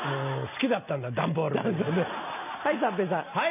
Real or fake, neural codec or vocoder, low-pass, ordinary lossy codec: real; none; 3.6 kHz; none